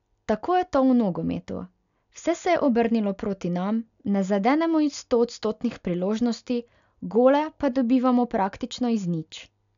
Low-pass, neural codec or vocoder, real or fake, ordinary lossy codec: 7.2 kHz; none; real; MP3, 96 kbps